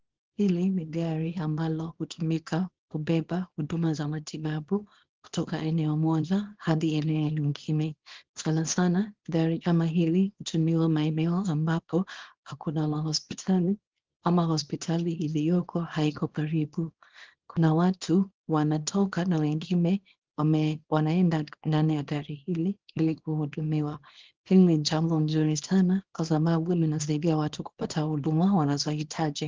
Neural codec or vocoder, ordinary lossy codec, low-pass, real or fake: codec, 24 kHz, 0.9 kbps, WavTokenizer, small release; Opus, 16 kbps; 7.2 kHz; fake